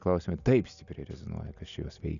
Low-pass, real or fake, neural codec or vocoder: 7.2 kHz; real; none